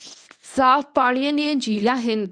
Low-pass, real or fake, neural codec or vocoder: 9.9 kHz; fake; codec, 24 kHz, 0.9 kbps, WavTokenizer, medium speech release version 1